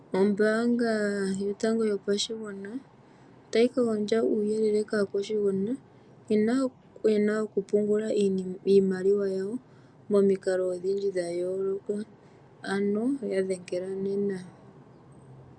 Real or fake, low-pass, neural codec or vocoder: real; 9.9 kHz; none